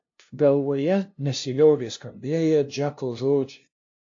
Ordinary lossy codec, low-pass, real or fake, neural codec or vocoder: AAC, 48 kbps; 7.2 kHz; fake; codec, 16 kHz, 0.5 kbps, FunCodec, trained on LibriTTS, 25 frames a second